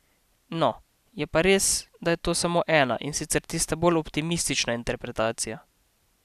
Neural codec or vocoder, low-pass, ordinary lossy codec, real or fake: none; 14.4 kHz; none; real